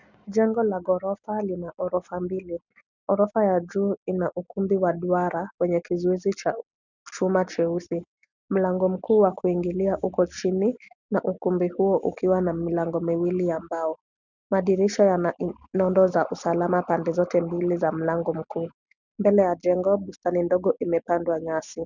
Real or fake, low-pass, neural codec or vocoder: real; 7.2 kHz; none